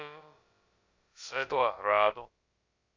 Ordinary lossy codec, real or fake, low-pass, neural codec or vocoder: Opus, 64 kbps; fake; 7.2 kHz; codec, 16 kHz, about 1 kbps, DyCAST, with the encoder's durations